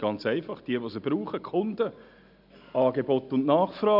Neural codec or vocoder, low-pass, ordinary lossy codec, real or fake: none; 5.4 kHz; none; real